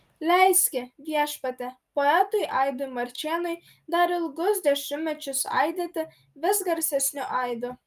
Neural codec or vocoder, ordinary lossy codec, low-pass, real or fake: none; Opus, 32 kbps; 14.4 kHz; real